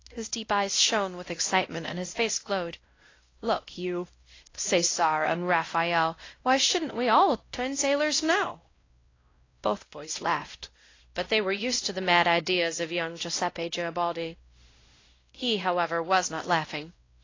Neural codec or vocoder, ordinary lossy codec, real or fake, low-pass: codec, 16 kHz, 0.5 kbps, X-Codec, WavLM features, trained on Multilingual LibriSpeech; AAC, 32 kbps; fake; 7.2 kHz